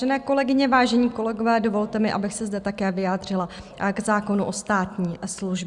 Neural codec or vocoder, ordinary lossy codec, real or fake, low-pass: none; Opus, 64 kbps; real; 10.8 kHz